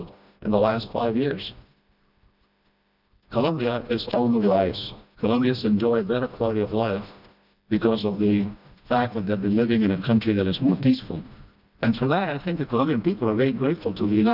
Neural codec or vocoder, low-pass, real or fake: codec, 16 kHz, 1 kbps, FreqCodec, smaller model; 5.4 kHz; fake